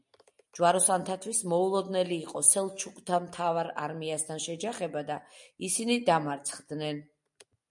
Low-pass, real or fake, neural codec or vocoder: 10.8 kHz; real; none